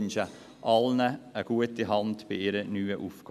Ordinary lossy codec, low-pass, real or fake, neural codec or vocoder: none; 14.4 kHz; real; none